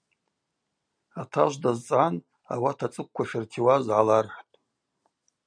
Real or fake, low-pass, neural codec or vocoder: real; 9.9 kHz; none